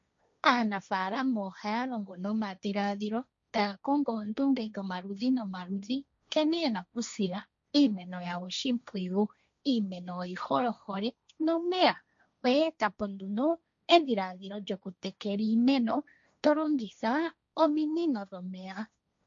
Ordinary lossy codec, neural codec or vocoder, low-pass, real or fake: MP3, 48 kbps; codec, 16 kHz, 1.1 kbps, Voila-Tokenizer; 7.2 kHz; fake